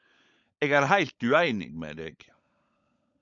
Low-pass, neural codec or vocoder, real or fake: 7.2 kHz; codec, 16 kHz, 16 kbps, FunCodec, trained on LibriTTS, 50 frames a second; fake